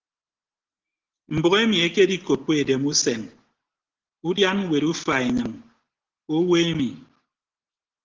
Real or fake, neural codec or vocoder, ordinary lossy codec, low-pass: real; none; Opus, 16 kbps; 7.2 kHz